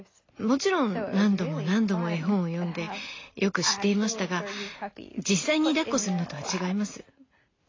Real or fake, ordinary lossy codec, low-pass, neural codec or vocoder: real; AAC, 32 kbps; 7.2 kHz; none